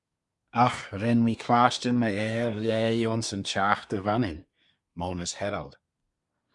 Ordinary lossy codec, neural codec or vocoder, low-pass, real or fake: Opus, 64 kbps; codec, 24 kHz, 1 kbps, SNAC; 10.8 kHz; fake